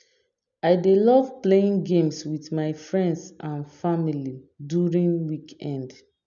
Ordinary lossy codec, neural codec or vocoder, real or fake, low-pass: none; none; real; 7.2 kHz